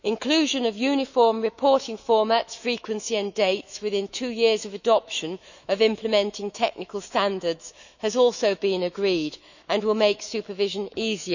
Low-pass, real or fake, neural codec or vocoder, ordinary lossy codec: 7.2 kHz; fake; autoencoder, 48 kHz, 128 numbers a frame, DAC-VAE, trained on Japanese speech; none